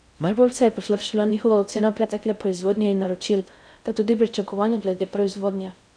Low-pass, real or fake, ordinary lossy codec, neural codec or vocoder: 9.9 kHz; fake; none; codec, 16 kHz in and 24 kHz out, 0.6 kbps, FocalCodec, streaming, 4096 codes